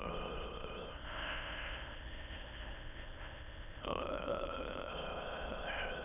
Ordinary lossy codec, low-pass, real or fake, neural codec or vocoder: none; 3.6 kHz; fake; autoencoder, 22.05 kHz, a latent of 192 numbers a frame, VITS, trained on many speakers